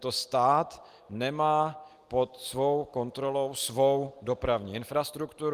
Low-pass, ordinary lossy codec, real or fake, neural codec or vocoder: 14.4 kHz; Opus, 24 kbps; real; none